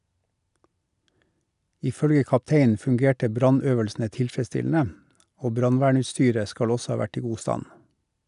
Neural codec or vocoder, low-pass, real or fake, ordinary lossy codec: none; 10.8 kHz; real; none